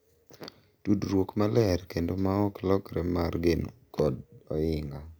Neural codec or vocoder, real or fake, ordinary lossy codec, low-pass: none; real; none; none